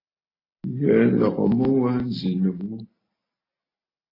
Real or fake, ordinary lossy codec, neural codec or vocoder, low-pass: real; AAC, 24 kbps; none; 5.4 kHz